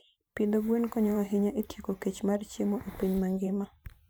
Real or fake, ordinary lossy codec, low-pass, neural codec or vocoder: fake; none; none; vocoder, 44.1 kHz, 128 mel bands every 512 samples, BigVGAN v2